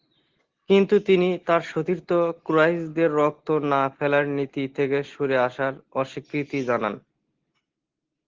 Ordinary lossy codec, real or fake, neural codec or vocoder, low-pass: Opus, 16 kbps; real; none; 7.2 kHz